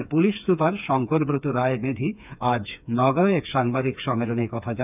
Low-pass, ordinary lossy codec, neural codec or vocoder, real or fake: 3.6 kHz; none; codec, 16 kHz, 4 kbps, FreqCodec, smaller model; fake